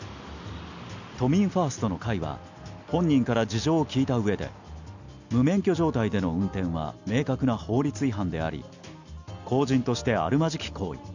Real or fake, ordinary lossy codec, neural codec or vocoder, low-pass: real; none; none; 7.2 kHz